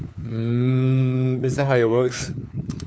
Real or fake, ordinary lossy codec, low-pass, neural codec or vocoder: fake; none; none; codec, 16 kHz, 4 kbps, FunCodec, trained on LibriTTS, 50 frames a second